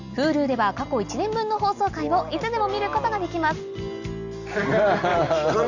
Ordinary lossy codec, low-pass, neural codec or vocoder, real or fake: none; 7.2 kHz; none; real